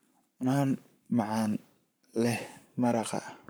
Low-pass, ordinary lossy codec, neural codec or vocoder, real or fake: none; none; codec, 44.1 kHz, 7.8 kbps, Pupu-Codec; fake